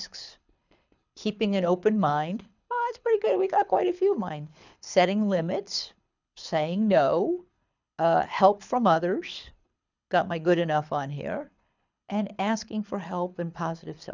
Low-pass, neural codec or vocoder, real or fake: 7.2 kHz; codec, 24 kHz, 6 kbps, HILCodec; fake